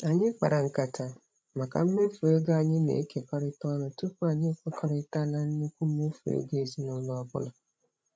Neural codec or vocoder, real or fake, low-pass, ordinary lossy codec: codec, 16 kHz, 16 kbps, FreqCodec, larger model; fake; none; none